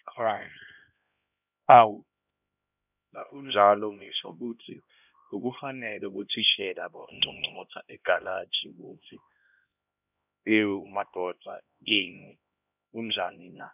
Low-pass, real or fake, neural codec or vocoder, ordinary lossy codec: 3.6 kHz; fake; codec, 16 kHz, 1 kbps, X-Codec, HuBERT features, trained on LibriSpeech; none